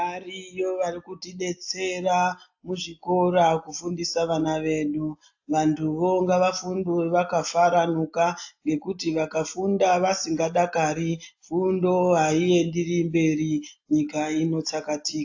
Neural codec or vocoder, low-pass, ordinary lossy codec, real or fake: none; 7.2 kHz; AAC, 48 kbps; real